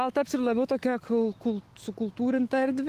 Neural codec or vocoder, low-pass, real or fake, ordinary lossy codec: autoencoder, 48 kHz, 128 numbers a frame, DAC-VAE, trained on Japanese speech; 14.4 kHz; fake; Opus, 16 kbps